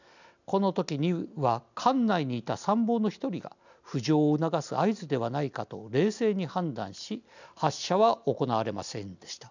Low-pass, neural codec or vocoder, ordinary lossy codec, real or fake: 7.2 kHz; none; none; real